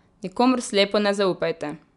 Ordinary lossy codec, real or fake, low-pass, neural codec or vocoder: none; real; 10.8 kHz; none